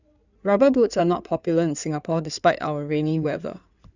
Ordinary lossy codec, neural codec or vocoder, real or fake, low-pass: none; codec, 16 kHz in and 24 kHz out, 2.2 kbps, FireRedTTS-2 codec; fake; 7.2 kHz